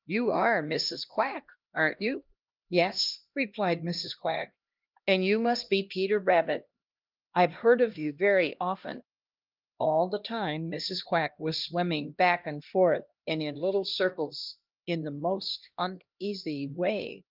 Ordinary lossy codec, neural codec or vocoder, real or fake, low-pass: Opus, 32 kbps; codec, 16 kHz, 1 kbps, X-Codec, HuBERT features, trained on LibriSpeech; fake; 5.4 kHz